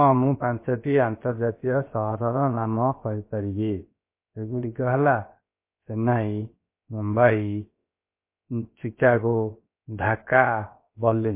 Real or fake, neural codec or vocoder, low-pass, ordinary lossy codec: fake; codec, 16 kHz, 0.7 kbps, FocalCodec; 3.6 kHz; MP3, 24 kbps